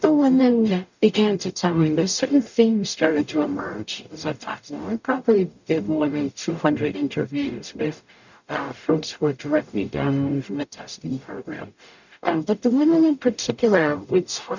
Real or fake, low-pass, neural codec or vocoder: fake; 7.2 kHz; codec, 44.1 kHz, 0.9 kbps, DAC